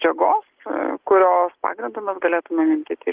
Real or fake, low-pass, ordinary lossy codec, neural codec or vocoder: real; 3.6 kHz; Opus, 16 kbps; none